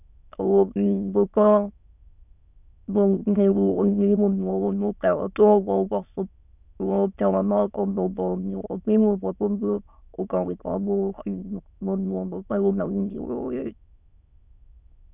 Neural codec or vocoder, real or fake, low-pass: autoencoder, 22.05 kHz, a latent of 192 numbers a frame, VITS, trained on many speakers; fake; 3.6 kHz